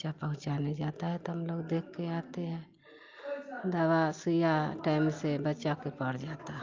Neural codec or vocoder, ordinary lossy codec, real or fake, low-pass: none; Opus, 24 kbps; real; 7.2 kHz